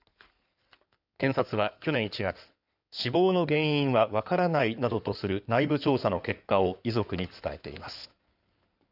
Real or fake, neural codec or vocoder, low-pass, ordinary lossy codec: fake; codec, 16 kHz in and 24 kHz out, 2.2 kbps, FireRedTTS-2 codec; 5.4 kHz; none